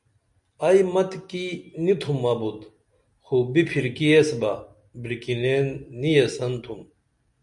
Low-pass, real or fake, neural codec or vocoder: 10.8 kHz; real; none